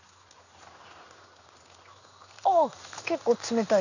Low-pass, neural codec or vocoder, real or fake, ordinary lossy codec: 7.2 kHz; codec, 16 kHz in and 24 kHz out, 1 kbps, XY-Tokenizer; fake; none